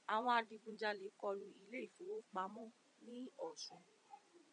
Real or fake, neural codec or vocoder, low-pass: fake; vocoder, 22.05 kHz, 80 mel bands, Vocos; 9.9 kHz